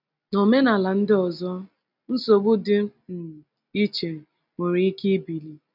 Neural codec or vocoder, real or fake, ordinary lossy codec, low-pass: none; real; none; 5.4 kHz